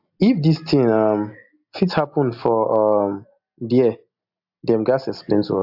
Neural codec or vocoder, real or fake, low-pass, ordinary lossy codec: none; real; 5.4 kHz; AAC, 48 kbps